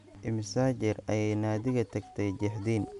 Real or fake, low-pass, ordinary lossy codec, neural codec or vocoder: real; 10.8 kHz; MP3, 96 kbps; none